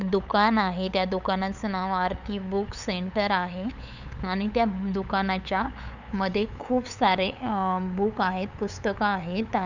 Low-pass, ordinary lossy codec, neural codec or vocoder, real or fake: 7.2 kHz; none; codec, 16 kHz, 8 kbps, FunCodec, trained on LibriTTS, 25 frames a second; fake